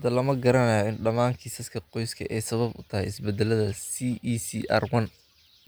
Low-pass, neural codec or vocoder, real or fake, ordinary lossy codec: none; none; real; none